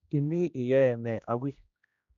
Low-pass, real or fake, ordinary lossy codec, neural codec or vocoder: 7.2 kHz; fake; none; codec, 16 kHz, 1 kbps, X-Codec, HuBERT features, trained on general audio